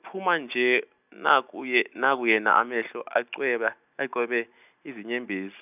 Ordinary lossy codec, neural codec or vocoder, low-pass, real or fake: none; none; 3.6 kHz; real